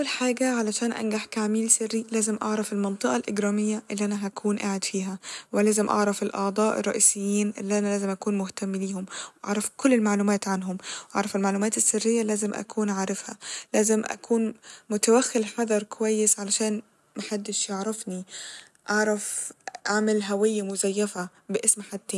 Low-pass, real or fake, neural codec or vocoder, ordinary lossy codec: none; real; none; none